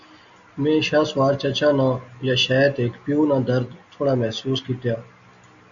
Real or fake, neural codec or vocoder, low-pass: real; none; 7.2 kHz